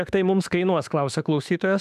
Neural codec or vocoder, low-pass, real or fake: autoencoder, 48 kHz, 32 numbers a frame, DAC-VAE, trained on Japanese speech; 14.4 kHz; fake